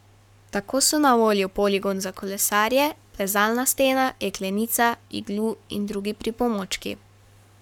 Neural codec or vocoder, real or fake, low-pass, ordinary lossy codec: codec, 44.1 kHz, 7.8 kbps, Pupu-Codec; fake; 19.8 kHz; none